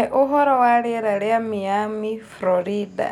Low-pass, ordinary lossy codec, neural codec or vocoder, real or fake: 19.8 kHz; none; none; real